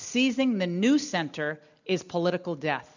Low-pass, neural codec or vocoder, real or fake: 7.2 kHz; none; real